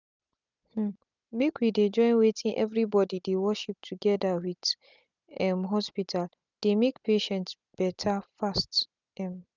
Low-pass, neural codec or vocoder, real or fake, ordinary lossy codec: 7.2 kHz; none; real; none